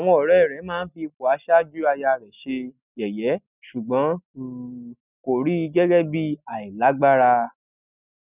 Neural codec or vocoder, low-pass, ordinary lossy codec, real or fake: none; 3.6 kHz; none; real